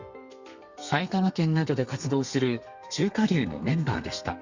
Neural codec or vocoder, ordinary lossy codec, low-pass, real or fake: codec, 32 kHz, 1.9 kbps, SNAC; Opus, 64 kbps; 7.2 kHz; fake